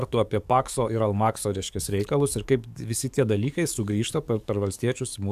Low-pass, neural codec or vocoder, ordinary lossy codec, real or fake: 14.4 kHz; codec, 44.1 kHz, 7.8 kbps, DAC; AAC, 96 kbps; fake